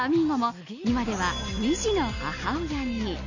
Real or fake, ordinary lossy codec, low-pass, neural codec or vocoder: real; none; 7.2 kHz; none